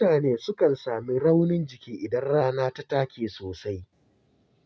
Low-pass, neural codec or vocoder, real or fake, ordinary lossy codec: none; none; real; none